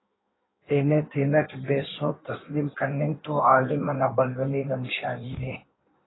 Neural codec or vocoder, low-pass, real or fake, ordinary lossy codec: codec, 16 kHz in and 24 kHz out, 1.1 kbps, FireRedTTS-2 codec; 7.2 kHz; fake; AAC, 16 kbps